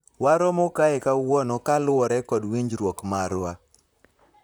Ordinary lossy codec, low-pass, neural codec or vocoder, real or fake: none; none; vocoder, 44.1 kHz, 128 mel bands, Pupu-Vocoder; fake